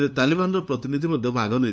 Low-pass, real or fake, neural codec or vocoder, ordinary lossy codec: none; fake; codec, 16 kHz, 2 kbps, FunCodec, trained on LibriTTS, 25 frames a second; none